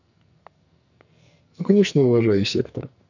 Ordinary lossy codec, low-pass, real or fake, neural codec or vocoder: none; 7.2 kHz; fake; codec, 44.1 kHz, 2.6 kbps, SNAC